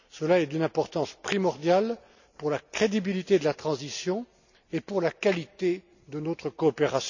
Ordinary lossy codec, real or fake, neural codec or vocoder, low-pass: none; real; none; 7.2 kHz